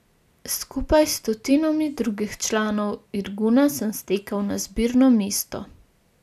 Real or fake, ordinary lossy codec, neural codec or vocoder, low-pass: real; none; none; 14.4 kHz